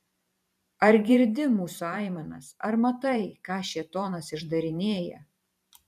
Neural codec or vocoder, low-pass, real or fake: vocoder, 44.1 kHz, 128 mel bands every 512 samples, BigVGAN v2; 14.4 kHz; fake